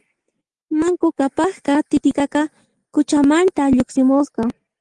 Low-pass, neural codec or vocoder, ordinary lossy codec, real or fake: 10.8 kHz; none; Opus, 24 kbps; real